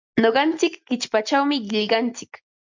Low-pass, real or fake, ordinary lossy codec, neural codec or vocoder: 7.2 kHz; real; MP3, 64 kbps; none